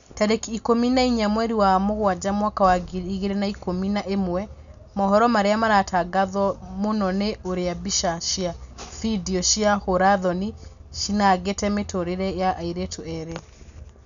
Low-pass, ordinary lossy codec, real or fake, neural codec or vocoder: 7.2 kHz; none; real; none